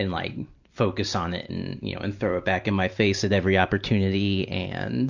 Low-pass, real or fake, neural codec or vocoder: 7.2 kHz; real; none